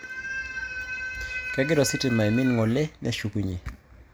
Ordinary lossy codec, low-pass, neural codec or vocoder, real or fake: none; none; none; real